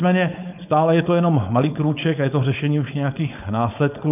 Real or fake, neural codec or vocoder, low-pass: fake; codec, 16 kHz, 4.8 kbps, FACodec; 3.6 kHz